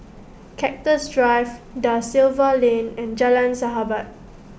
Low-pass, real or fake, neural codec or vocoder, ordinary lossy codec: none; real; none; none